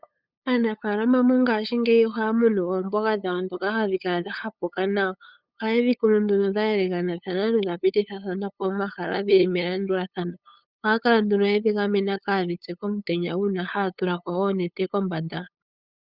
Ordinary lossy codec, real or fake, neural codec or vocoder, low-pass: Opus, 64 kbps; fake; codec, 16 kHz, 8 kbps, FunCodec, trained on LibriTTS, 25 frames a second; 5.4 kHz